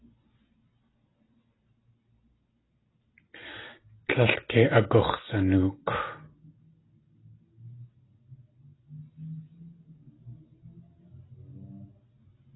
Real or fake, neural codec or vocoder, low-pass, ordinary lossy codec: real; none; 7.2 kHz; AAC, 16 kbps